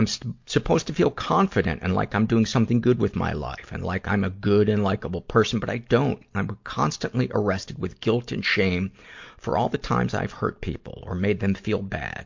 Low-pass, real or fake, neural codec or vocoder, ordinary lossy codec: 7.2 kHz; real; none; MP3, 48 kbps